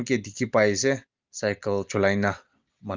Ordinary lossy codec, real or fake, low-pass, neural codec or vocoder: Opus, 24 kbps; real; 7.2 kHz; none